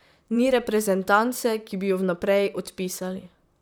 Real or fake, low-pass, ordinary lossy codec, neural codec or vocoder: fake; none; none; vocoder, 44.1 kHz, 128 mel bands, Pupu-Vocoder